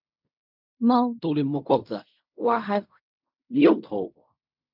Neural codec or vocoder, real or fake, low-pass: codec, 16 kHz in and 24 kHz out, 0.4 kbps, LongCat-Audio-Codec, fine tuned four codebook decoder; fake; 5.4 kHz